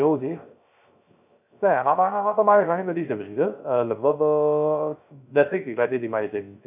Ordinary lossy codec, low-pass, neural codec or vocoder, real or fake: none; 3.6 kHz; codec, 16 kHz, 0.3 kbps, FocalCodec; fake